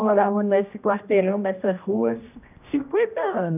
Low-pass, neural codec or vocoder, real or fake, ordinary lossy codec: 3.6 kHz; codec, 16 kHz, 1 kbps, X-Codec, HuBERT features, trained on general audio; fake; none